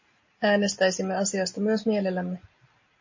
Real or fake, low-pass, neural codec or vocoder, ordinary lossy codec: real; 7.2 kHz; none; MP3, 32 kbps